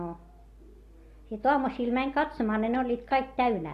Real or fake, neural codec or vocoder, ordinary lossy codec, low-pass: real; none; AAC, 48 kbps; 14.4 kHz